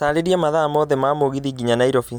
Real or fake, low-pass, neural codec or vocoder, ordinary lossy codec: real; none; none; none